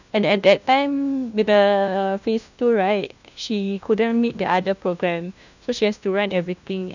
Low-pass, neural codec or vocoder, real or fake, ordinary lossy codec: 7.2 kHz; codec, 16 kHz, 1 kbps, FunCodec, trained on LibriTTS, 50 frames a second; fake; none